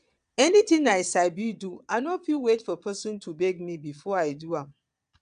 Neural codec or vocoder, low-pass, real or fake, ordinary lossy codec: vocoder, 22.05 kHz, 80 mel bands, Vocos; 9.9 kHz; fake; none